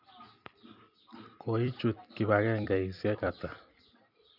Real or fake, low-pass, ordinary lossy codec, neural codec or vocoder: fake; 5.4 kHz; none; vocoder, 44.1 kHz, 128 mel bands every 256 samples, BigVGAN v2